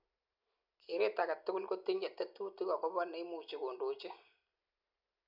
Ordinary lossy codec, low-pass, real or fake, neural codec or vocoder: none; 5.4 kHz; real; none